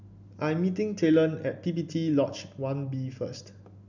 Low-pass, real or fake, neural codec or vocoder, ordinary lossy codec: 7.2 kHz; real; none; none